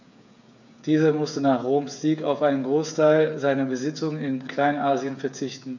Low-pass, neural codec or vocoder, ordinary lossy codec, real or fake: 7.2 kHz; codec, 16 kHz, 8 kbps, FreqCodec, smaller model; none; fake